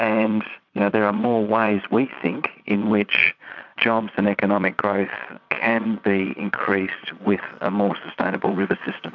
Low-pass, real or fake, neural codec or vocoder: 7.2 kHz; fake; vocoder, 22.05 kHz, 80 mel bands, Vocos